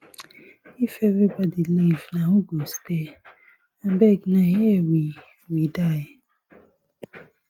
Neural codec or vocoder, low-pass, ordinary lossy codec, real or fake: none; 19.8 kHz; Opus, 32 kbps; real